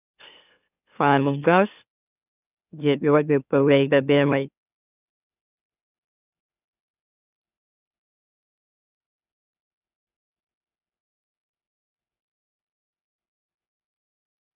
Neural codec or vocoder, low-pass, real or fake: autoencoder, 44.1 kHz, a latent of 192 numbers a frame, MeloTTS; 3.6 kHz; fake